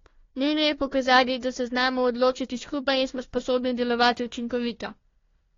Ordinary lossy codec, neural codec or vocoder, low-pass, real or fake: AAC, 32 kbps; codec, 16 kHz, 1 kbps, FunCodec, trained on Chinese and English, 50 frames a second; 7.2 kHz; fake